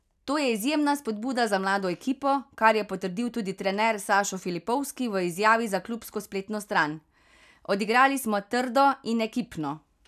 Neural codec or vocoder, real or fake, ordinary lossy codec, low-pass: none; real; none; 14.4 kHz